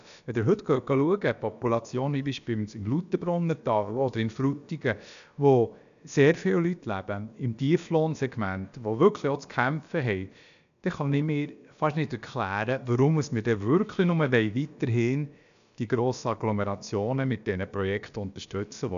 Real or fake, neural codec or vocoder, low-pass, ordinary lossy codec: fake; codec, 16 kHz, about 1 kbps, DyCAST, with the encoder's durations; 7.2 kHz; none